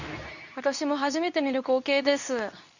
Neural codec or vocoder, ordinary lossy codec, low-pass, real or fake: codec, 24 kHz, 0.9 kbps, WavTokenizer, medium speech release version 2; none; 7.2 kHz; fake